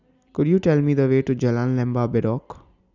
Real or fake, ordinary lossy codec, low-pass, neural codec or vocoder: real; none; 7.2 kHz; none